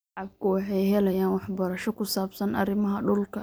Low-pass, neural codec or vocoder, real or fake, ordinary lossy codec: none; none; real; none